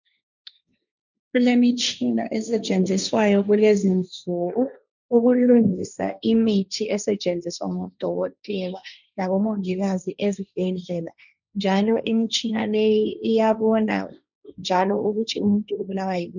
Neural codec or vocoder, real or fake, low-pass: codec, 16 kHz, 1.1 kbps, Voila-Tokenizer; fake; 7.2 kHz